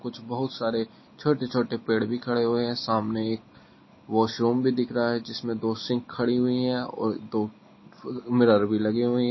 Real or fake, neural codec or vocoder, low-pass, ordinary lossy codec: real; none; 7.2 kHz; MP3, 24 kbps